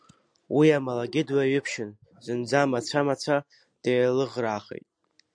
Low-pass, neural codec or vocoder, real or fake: 9.9 kHz; none; real